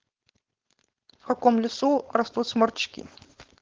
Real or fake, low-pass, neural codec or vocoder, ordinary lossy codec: fake; 7.2 kHz; codec, 16 kHz, 4.8 kbps, FACodec; Opus, 16 kbps